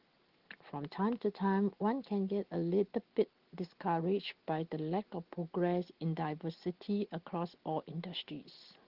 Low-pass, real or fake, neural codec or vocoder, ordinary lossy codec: 5.4 kHz; real; none; Opus, 16 kbps